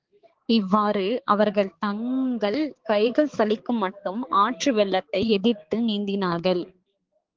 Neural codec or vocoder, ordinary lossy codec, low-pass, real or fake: codec, 16 kHz, 4 kbps, X-Codec, HuBERT features, trained on balanced general audio; Opus, 16 kbps; 7.2 kHz; fake